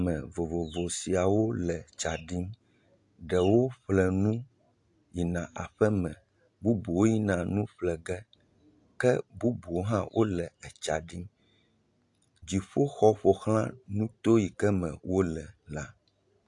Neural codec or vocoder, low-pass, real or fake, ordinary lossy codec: none; 10.8 kHz; real; AAC, 64 kbps